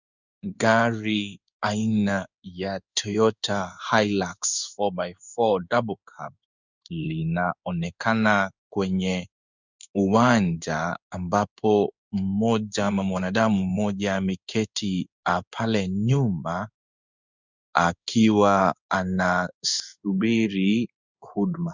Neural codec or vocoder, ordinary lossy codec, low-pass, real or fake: codec, 16 kHz in and 24 kHz out, 1 kbps, XY-Tokenizer; Opus, 64 kbps; 7.2 kHz; fake